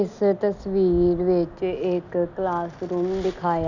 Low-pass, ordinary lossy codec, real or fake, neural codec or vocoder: 7.2 kHz; none; real; none